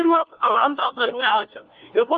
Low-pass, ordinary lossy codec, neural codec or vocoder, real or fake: 7.2 kHz; Opus, 24 kbps; codec, 16 kHz, 1 kbps, FunCodec, trained on LibriTTS, 50 frames a second; fake